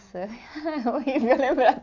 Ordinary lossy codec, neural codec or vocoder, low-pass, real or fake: AAC, 48 kbps; none; 7.2 kHz; real